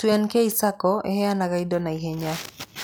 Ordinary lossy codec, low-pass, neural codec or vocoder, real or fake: none; none; none; real